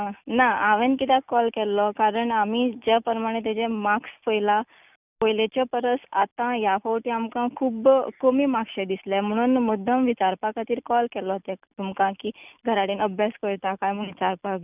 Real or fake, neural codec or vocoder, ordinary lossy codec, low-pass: real; none; none; 3.6 kHz